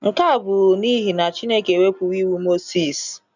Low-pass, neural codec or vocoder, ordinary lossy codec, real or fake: 7.2 kHz; none; none; real